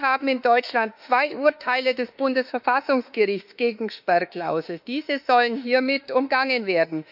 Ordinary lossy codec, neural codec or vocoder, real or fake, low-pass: none; autoencoder, 48 kHz, 32 numbers a frame, DAC-VAE, trained on Japanese speech; fake; 5.4 kHz